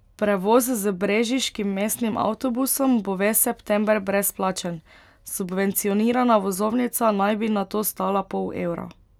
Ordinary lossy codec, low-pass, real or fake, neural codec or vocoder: none; 19.8 kHz; real; none